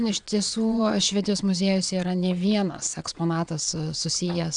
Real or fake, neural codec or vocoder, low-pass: fake; vocoder, 22.05 kHz, 80 mel bands, WaveNeXt; 9.9 kHz